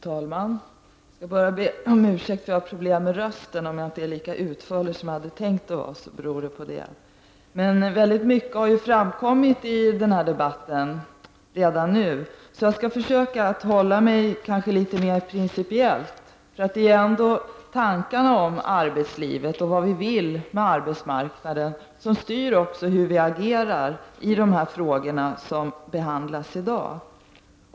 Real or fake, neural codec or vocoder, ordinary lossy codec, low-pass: real; none; none; none